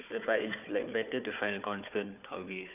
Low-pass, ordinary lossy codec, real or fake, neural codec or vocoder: 3.6 kHz; none; fake; codec, 16 kHz, 4 kbps, FunCodec, trained on Chinese and English, 50 frames a second